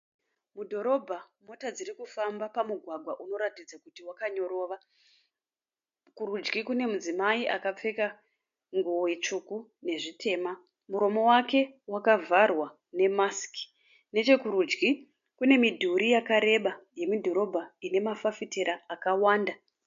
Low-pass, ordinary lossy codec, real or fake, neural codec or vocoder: 7.2 kHz; MP3, 48 kbps; real; none